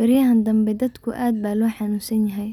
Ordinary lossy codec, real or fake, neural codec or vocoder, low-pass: none; real; none; 19.8 kHz